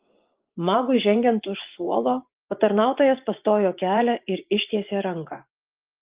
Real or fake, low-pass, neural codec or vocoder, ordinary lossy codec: fake; 3.6 kHz; vocoder, 24 kHz, 100 mel bands, Vocos; Opus, 32 kbps